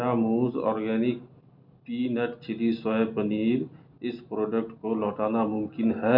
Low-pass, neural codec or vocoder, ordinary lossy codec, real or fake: 5.4 kHz; none; none; real